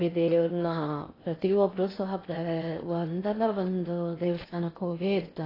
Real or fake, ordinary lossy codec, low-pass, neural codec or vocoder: fake; AAC, 24 kbps; 5.4 kHz; codec, 16 kHz in and 24 kHz out, 0.6 kbps, FocalCodec, streaming, 2048 codes